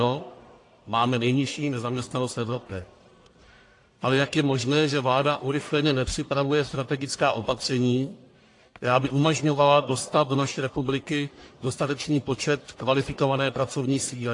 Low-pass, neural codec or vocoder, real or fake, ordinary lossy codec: 10.8 kHz; codec, 44.1 kHz, 1.7 kbps, Pupu-Codec; fake; AAC, 48 kbps